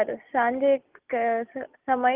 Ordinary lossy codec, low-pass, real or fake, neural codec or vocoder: Opus, 32 kbps; 3.6 kHz; real; none